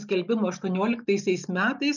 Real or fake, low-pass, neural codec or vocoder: real; 7.2 kHz; none